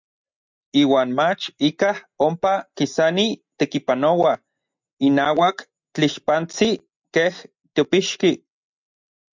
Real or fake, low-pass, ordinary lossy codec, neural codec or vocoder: real; 7.2 kHz; MP3, 64 kbps; none